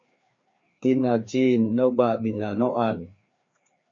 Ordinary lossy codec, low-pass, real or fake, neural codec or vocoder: MP3, 48 kbps; 7.2 kHz; fake; codec, 16 kHz, 2 kbps, FreqCodec, larger model